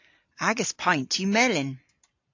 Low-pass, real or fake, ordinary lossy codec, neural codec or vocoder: 7.2 kHz; real; AAC, 48 kbps; none